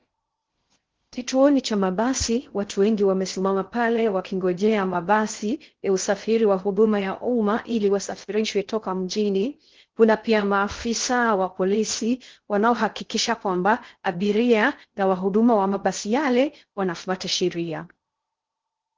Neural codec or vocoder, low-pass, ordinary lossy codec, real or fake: codec, 16 kHz in and 24 kHz out, 0.6 kbps, FocalCodec, streaming, 2048 codes; 7.2 kHz; Opus, 16 kbps; fake